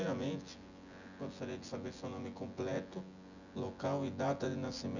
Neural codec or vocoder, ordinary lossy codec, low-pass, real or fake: vocoder, 24 kHz, 100 mel bands, Vocos; none; 7.2 kHz; fake